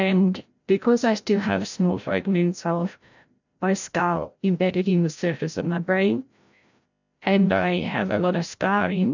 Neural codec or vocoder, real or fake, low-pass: codec, 16 kHz, 0.5 kbps, FreqCodec, larger model; fake; 7.2 kHz